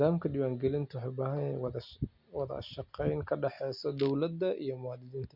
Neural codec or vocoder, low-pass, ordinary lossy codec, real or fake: none; 5.4 kHz; Opus, 64 kbps; real